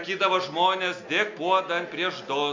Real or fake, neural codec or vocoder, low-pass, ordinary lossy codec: real; none; 7.2 kHz; MP3, 64 kbps